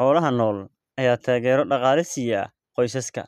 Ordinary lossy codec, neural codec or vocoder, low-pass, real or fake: none; none; 14.4 kHz; real